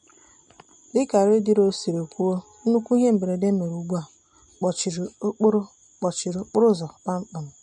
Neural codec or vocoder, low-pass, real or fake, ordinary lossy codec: none; 14.4 kHz; real; MP3, 48 kbps